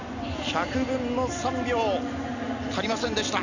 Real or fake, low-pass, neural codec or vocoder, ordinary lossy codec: real; 7.2 kHz; none; none